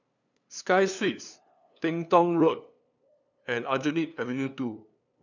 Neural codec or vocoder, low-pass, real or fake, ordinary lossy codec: codec, 16 kHz, 2 kbps, FunCodec, trained on LibriTTS, 25 frames a second; 7.2 kHz; fake; none